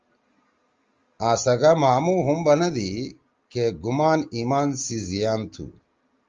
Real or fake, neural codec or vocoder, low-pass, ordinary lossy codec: real; none; 7.2 kHz; Opus, 32 kbps